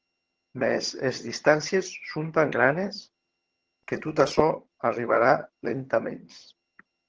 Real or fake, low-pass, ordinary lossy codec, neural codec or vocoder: fake; 7.2 kHz; Opus, 16 kbps; vocoder, 22.05 kHz, 80 mel bands, HiFi-GAN